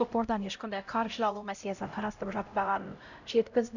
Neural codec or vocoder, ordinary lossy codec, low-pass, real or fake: codec, 16 kHz, 0.5 kbps, X-Codec, HuBERT features, trained on LibriSpeech; none; 7.2 kHz; fake